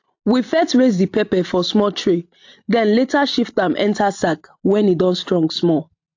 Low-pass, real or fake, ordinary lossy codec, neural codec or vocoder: 7.2 kHz; real; AAC, 48 kbps; none